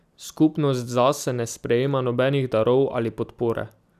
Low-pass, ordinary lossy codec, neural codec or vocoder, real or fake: 14.4 kHz; none; vocoder, 44.1 kHz, 128 mel bands every 256 samples, BigVGAN v2; fake